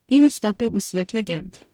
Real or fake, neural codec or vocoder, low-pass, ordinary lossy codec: fake; codec, 44.1 kHz, 0.9 kbps, DAC; 19.8 kHz; none